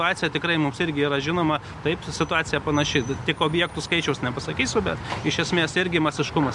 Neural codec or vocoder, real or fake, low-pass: none; real; 10.8 kHz